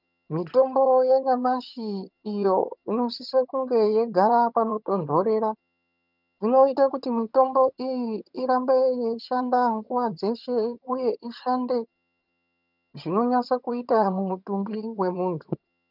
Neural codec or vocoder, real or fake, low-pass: vocoder, 22.05 kHz, 80 mel bands, HiFi-GAN; fake; 5.4 kHz